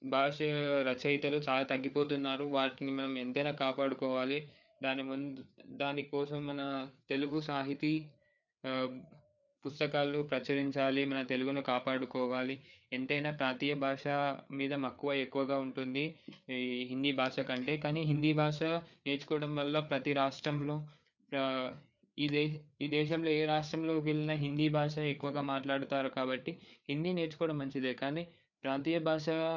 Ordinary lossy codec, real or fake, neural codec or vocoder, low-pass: none; fake; codec, 16 kHz, 4 kbps, FreqCodec, larger model; 7.2 kHz